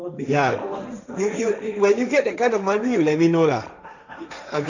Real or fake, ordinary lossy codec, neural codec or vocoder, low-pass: fake; none; codec, 16 kHz, 1.1 kbps, Voila-Tokenizer; 7.2 kHz